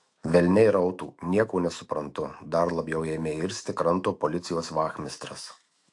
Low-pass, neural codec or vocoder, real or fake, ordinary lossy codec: 10.8 kHz; autoencoder, 48 kHz, 128 numbers a frame, DAC-VAE, trained on Japanese speech; fake; AAC, 64 kbps